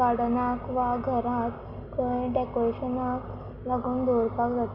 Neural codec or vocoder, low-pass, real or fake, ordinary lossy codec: none; 5.4 kHz; real; none